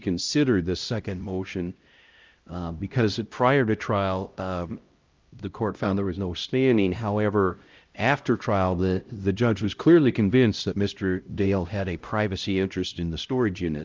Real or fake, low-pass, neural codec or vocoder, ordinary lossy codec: fake; 7.2 kHz; codec, 16 kHz, 0.5 kbps, X-Codec, HuBERT features, trained on LibriSpeech; Opus, 24 kbps